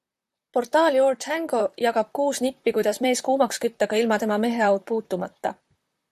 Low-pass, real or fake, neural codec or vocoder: 14.4 kHz; fake; vocoder, 44.1 kHz, 128 mel bands, Pupu-Vocoder